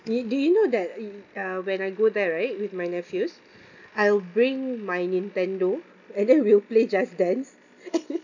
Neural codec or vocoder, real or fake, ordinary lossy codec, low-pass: none; real; none; 7.2 kHz